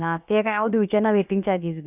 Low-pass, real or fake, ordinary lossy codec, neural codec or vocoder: 3.6 kHz; fake; none; codec, 16 kHz, about 1 kbps, DyCAST, with the encoder's durations